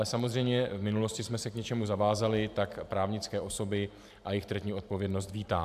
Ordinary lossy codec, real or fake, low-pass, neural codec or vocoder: AAC, 96 kbps; fake; 14.4 kHz; vocoder, 44.1 kHz, 128 mel bands every 512 samples, BigVGAN v2